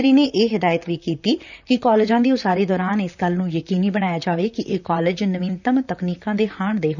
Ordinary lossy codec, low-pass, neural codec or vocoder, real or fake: none; 7.2 kHz; vocoder, 44.1 kHz, 128 mel bands, Pupu-Vocoder; fake